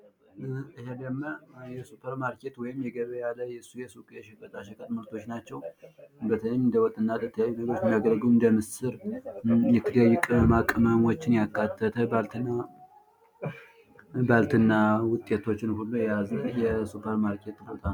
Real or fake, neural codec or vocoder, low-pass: real; none; 19.8 kHz